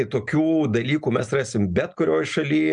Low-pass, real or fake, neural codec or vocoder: 9.9 kHz; real; none